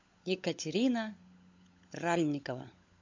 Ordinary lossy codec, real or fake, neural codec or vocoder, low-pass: MP3, 48 kbps; fake; codec, 16 kHz, 16 kbps, FunCodec, trained on LibriTTS, 50 frames a second; 7.2 kHz